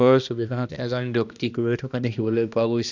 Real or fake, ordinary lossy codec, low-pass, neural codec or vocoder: fake; none; 7.2 kHz; codec, 16 kHz, 1 kbps, X-Codec, HuBERT features, trained on balanced general audio